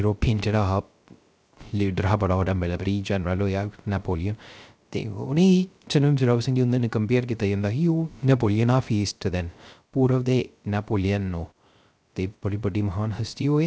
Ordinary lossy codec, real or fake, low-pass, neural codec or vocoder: none; fake; none; codec, 16 kHz, 0.3 kbps, FocalCodec